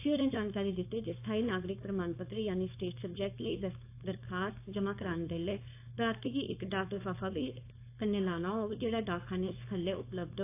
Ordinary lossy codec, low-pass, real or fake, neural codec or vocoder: AAC, 24 kbps; 3.6 kHz; fake; codec, 16 kHz, 4.8 kbps, FACodec